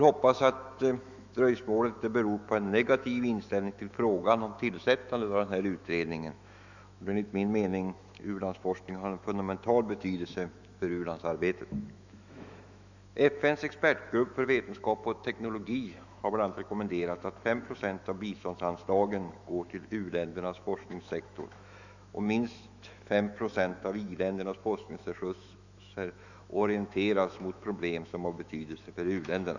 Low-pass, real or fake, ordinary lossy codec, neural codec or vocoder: 7.2 kHz; fake; none; autoencoder, 48 kHz, 128 numbers a frame, DAC-VAE, trained on Japanese speech